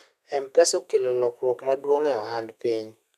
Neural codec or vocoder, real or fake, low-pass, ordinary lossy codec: codec, 32 kHz, 1.9 kbps, SNAC; fake; 14.4 kHz; none